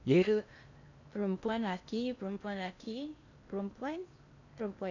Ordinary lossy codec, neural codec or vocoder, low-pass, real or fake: none; codec, 16 kHz in and 24 kHz out, 0.8 kbps, FocalCodec, streaming, 65536 codes; 7.2 kHz; fake